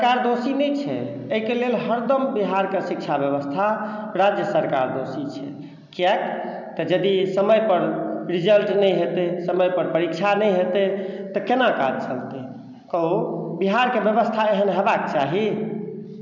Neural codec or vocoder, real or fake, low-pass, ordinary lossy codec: none; real; 7.2 kHz; none